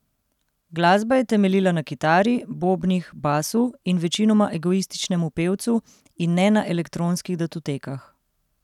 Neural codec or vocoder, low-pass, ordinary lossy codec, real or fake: none; 19.8 kHz; none; real